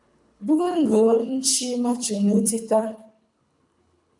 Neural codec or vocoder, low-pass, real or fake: codec, 24 kHz, 3 kbps, HILCodec; 10.8 kHz; fake